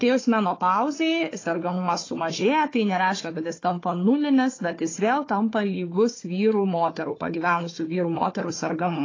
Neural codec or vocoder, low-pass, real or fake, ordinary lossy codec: codec, 16 kHz, 4 kbps, FunCodec, trained on Chinese and English, 50 frames a second; 7.2 kHz; fake; AAC, 32 kbps